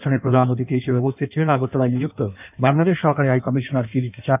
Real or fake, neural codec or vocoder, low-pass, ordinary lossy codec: fake; codec, 16 kHz in and 24 kHz out, 1.1 kbps, FireRedTTS-2 codec; 3.6 kHz; none